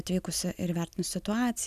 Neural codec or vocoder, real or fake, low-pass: none; real; 14.4 kHz